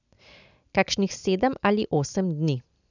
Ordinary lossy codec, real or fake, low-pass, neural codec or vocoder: none; real; 7.2 kHz; none